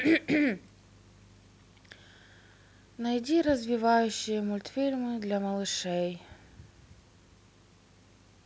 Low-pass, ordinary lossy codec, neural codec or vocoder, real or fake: none; none; none; real